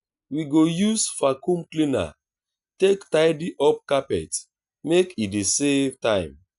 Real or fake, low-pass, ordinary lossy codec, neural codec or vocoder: real; 10.8 kHz; none; none